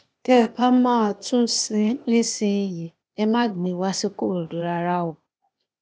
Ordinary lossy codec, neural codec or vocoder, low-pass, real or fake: none; codec, 16 kHz, 0.8 kbps, ZipCodec; none; fake